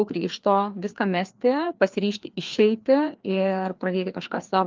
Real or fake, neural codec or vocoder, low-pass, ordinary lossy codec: fake; codec, 16 kHz, 2 kbps, FreqCodec, larger model; 7.2 kHz; Opus, 32 kbps